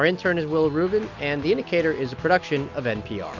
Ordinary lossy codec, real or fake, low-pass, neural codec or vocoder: MP3, 64 kbps; real; 7.2 kHz; none